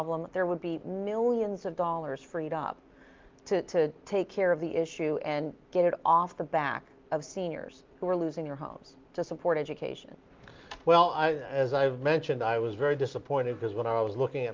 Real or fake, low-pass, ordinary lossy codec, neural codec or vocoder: real; 7.2 kHz; Opus, 32 kbps; none